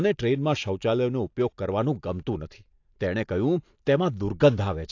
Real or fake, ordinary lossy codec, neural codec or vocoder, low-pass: real; AAC, 48 kbps; none; 7.2 kHz